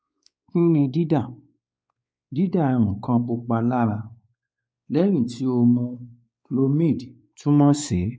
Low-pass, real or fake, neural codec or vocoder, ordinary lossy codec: none; fake; codec, 16 kHz, 4 kbps, X-Codec, WavLM features, trained on Multilingual LibriSpeech; none